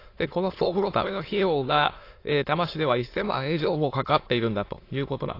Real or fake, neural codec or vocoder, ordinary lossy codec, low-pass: fake; autoencoder, 22.05 kHz, a latent of 192 numbers a frame, VITS, trained on many speakers; AAC, 32 kbps; 5.4 kHz